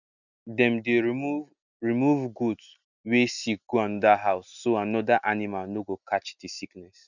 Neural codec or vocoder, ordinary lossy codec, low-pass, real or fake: none; none; 7.2 kHz; real